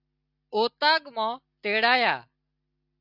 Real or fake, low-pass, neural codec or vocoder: real; 5.4 kHz; none